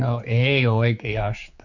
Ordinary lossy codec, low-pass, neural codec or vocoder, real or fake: none; 7.2 kHz; codec, 16 kHz, 4 kbps, X-Codec, HuBERT features, trained on general audio; fake